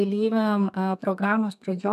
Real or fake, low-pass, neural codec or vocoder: fake; 14.4 kHz; codec, 32 kHz, 1.9 kbps, SNAC